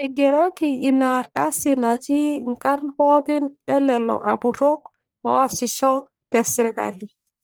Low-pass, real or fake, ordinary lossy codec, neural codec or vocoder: none; fake; none; codec, 44.1 kHz, 1.7 kbps, Pupu-Codec